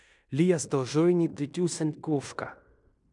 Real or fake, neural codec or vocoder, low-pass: fake; codec, 16 kHz in and 24 kHz out, 0.9 kbps, LongCat-Audio-Codec, fine tuned four codebook decoder; 10.8 kHz